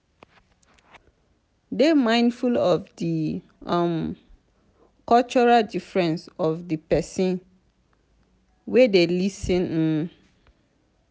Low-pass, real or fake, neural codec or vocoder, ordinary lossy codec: none; real; none; none